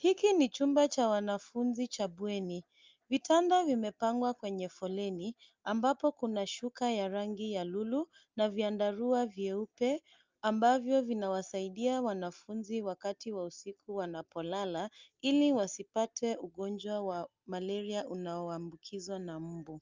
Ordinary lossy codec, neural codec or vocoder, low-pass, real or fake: Opus, 24 kbps; none; 7.2 kHz; real